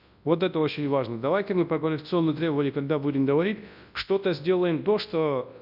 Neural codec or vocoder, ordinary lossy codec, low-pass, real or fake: codec, 24 kHz, 0.9 kbps, WavTokenizer, large speech release; none; 5.4 kHz; fake